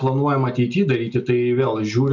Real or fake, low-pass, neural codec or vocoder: real; 7.2 kHz; none